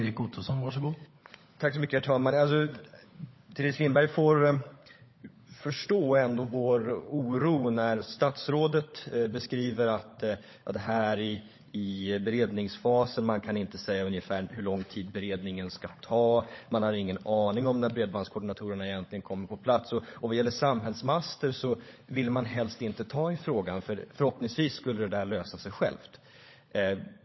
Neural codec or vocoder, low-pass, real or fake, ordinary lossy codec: codec, 16 kHz, 16 kbps, FunCodec, trained on LibriTTS, 50 frames a second; 7.2 kHz; fake; MP3, 24 kbps